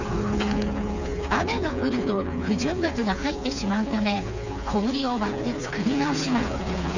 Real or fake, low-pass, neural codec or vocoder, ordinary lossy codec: fake; 7.2 kHz; codec, 16 kHz, 4 kbps, FreqCodec, smaller model; none